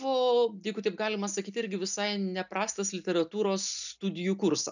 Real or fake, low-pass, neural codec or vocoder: real; 7.2 kHz; none